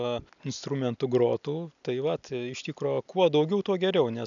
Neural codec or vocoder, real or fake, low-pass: none; real; 7.2 kHz